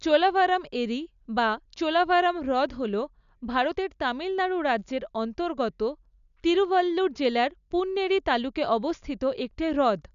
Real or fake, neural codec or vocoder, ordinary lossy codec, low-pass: real; none; none; 7.2 kHz